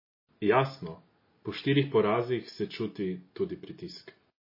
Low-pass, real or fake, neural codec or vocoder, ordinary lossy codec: 5.4 kHz; real; none; MP3, 24 kbps